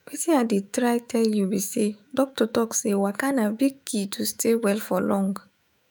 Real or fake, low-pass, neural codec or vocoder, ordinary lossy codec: fake; none; autoencoder, 48 kHz, 128 numbers a frame, DAC-VAE, trained on Japanese speech; none